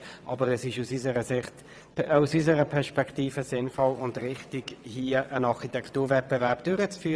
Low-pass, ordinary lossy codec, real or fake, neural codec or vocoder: none; none; fake; vocoder, 22.05 kHz, 80 mel bands, WaveNeXt